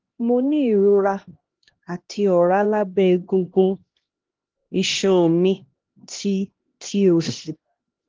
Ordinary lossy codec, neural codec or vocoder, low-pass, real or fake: Opus, 16 kbps; codec, 16 kHz, 1 kbps, X-Codec, HuBERT features, trained on LibriSpeech; 7.2 kHz; fake